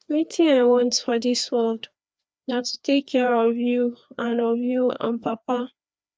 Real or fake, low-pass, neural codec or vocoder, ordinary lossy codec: fake; none; codec, 16 kHz, 2 kbps, FreqCodec, larger model; none